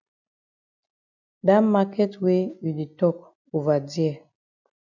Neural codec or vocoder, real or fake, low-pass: none; real; 7.2 kHz